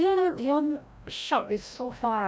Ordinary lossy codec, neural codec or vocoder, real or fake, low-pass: none; codec, 16 kHz, 0.5 kbps, FreqCodec, larger model; fake; none